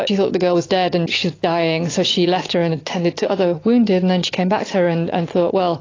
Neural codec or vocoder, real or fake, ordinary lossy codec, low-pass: none; real; AAC, 32 kbps; 7.2 kHz